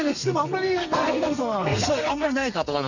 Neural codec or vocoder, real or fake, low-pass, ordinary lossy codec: codec, 32 kHz, 1.9 kbps, SNAC; fake; 7.2 kHz; none